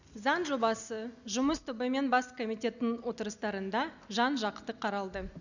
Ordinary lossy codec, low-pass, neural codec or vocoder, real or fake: none; 7.2 kHz; none; real